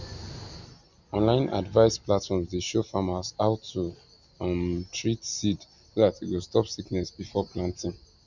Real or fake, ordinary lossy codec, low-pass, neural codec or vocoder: real; none; 7.2 kHz; none